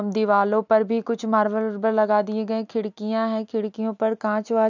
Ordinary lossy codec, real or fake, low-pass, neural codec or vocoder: none; real; 7.2 kHz; none